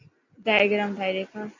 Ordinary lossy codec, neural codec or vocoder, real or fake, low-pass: MP3, 64 kbps; none; real; 7.2 kHz